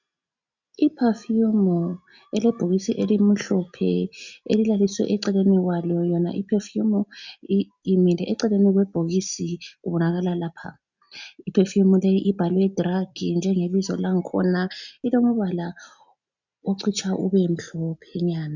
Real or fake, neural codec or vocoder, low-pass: real; none; 7.2 kHz